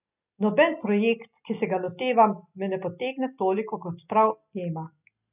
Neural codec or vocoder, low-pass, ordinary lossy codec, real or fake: none; 3.6 kHz; none; real